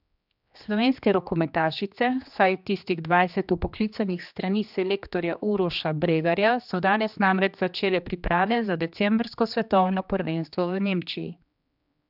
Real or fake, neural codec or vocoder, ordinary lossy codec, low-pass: fake; codec, 16 kHz, 2 kbps, X-Codec, HuBERT features, trained on general audio; none; 5.4 kHz